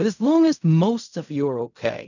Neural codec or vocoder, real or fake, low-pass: codec, 16 kHz in and 24 kHz out, 0.4 kbps, LongCat-Audio-Codec, fine tuned four codebook decoder; fake; 7.2 kHz